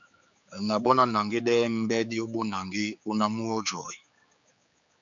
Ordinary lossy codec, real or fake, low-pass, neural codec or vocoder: MP3, 64 kbps; fake; 7.2 kHz; codec, 16 kHz, 4 kbps, X-Codec, HuBERT features, trained on general audio